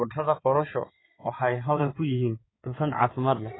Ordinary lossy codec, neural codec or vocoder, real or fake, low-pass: AAC, 16 kbps; codec, 16 kHz in and 24 kHz out, 2.2 kbps, FireRedTTS-2 codec; fake; 7.2 kHz